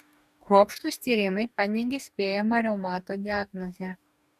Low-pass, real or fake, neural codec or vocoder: 14.4 kHz; fake; codec, 44.1 kHz, 2.6 kbps, DAC